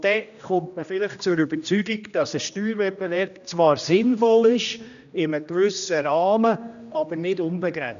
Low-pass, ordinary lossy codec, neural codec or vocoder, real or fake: 7.2 kHz; none; codec, 16 kHz, 1 kbps, X-Codec, HuBERT features, trained on general audio; fake